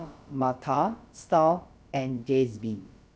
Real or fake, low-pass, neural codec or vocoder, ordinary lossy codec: fake; none; codec, 16 kHz, about 1 kbps, DyCAST, with the encoder's durations; none